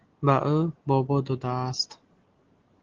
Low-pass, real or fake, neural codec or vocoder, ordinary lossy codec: 7.2 kHz; real; none; Opus, 16 kbps